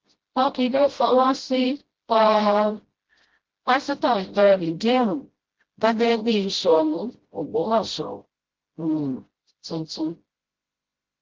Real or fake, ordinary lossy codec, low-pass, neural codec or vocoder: fake; Opus, 16 kbps; 7.2 kHz; codec, 16 kHz, 0.5 kbps, FreqCodec, smaller model